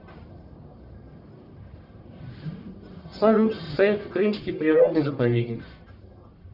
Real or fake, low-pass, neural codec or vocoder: fake; 5.4 kHz; codec, 44.1 kHz, 1.7 kbps, Pupu-Codec